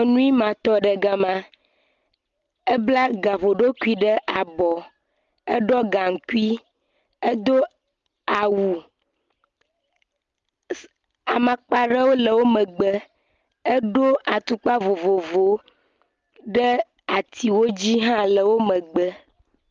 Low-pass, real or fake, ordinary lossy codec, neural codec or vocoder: 7.2 kHz; real; Opus, 32 kbps; none